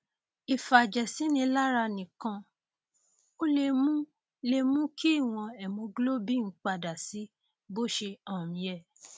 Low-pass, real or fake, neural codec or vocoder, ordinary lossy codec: none; real; none; none